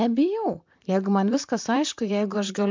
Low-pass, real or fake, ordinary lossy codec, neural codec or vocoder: 7.2 kHz; fake; MP3, 64 kbps; vocoder, 44.1 kHz, 128 mel bands, Pupu-Vocoder